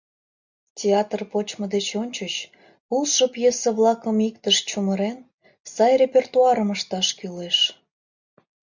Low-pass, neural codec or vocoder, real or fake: 7.2 kHz; none; real